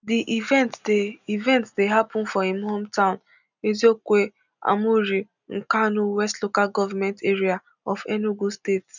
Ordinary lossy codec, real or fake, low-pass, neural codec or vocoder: none; real; 7.2 kHz; none